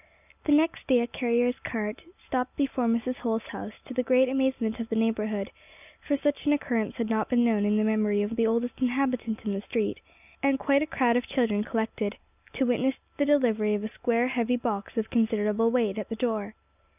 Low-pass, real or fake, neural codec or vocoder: 3.6 kHz; real; none